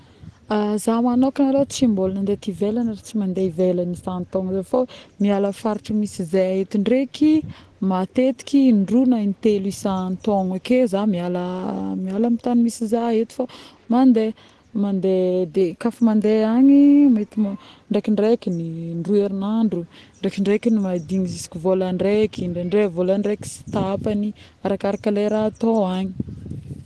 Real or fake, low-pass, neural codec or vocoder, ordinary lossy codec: real; 10.8 kHz; none; Opus, 16 kbps